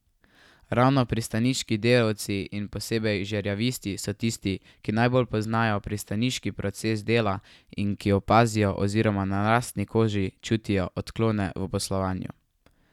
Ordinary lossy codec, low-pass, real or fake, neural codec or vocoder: none; 19.8 kHz; real; none